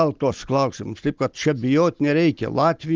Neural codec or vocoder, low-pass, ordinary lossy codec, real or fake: none; 7.2 kHz; Opus, 24 kbps; real